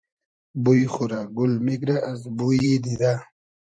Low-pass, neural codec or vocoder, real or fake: 9.9 kHz; vocoder, 44.1 kHz, 128 mel bands every 512 samples, BigVGAN v2; fake